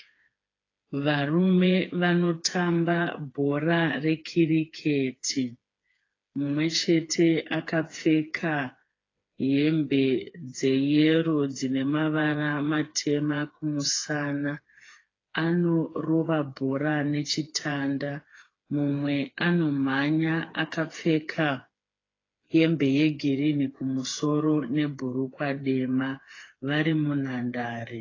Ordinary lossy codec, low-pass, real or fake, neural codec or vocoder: AAC, 32 kbps; 7.2 kHz; fake; codec, 16 kHz, 4 kbps, FreqCodec, smaller model